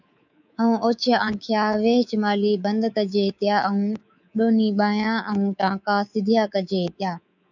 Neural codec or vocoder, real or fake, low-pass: codec, 24 kHz, 3.1 kbps, DualCodec; fake; 7.2 kHz